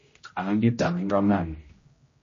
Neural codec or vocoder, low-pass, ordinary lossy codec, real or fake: codec, 16 kHz, 0.5 kbps, X-Codec, HuBERT features, trained on general audio; 7.2 kHz; MP3, 32 kbps; fake